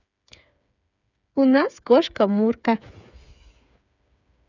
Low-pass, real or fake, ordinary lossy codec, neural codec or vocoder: 7.2 kHz; fake; none; codec, 16 kHz, 8 kbps, FreqCodec, smaller model